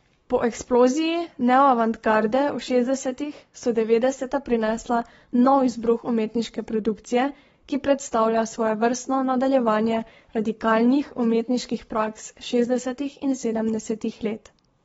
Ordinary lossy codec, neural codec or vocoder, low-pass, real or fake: AAC, 24 kbps; codec, 44.1 kHz, 7.8 kbps, Pupu-Codec; 19.8 kHz; fake